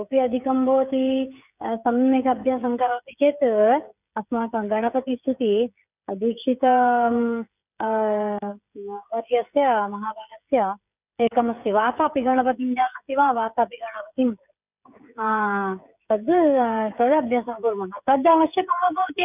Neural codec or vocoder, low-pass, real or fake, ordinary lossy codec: codec, 16 kHz, 16 kbps, FreqCodec, smaller model; 3.6 kHz; fake; none